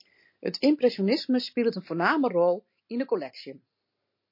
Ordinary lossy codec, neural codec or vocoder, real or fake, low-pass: MP3, 32 kbps; none; real; 5.4 kHz